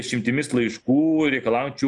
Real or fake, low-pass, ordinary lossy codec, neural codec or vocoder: real; 10.8 kHz; MP3, 96 kbps; none